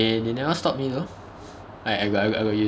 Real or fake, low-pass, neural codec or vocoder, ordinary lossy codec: real; none; none; none